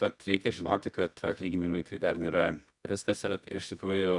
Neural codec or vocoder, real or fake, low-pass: codec, 24 kHz, 0.9 kbps, WavTokenizer, medium music audio release; fake; 10.8 kHz